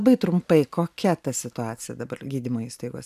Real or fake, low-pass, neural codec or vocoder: real; 14.4 kHz; none